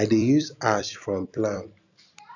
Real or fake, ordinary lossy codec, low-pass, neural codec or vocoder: fake; none; 7.2 kHz; vocoder, 44.1 kHz, 128 mel bands every 256 samples, BigVGAN v2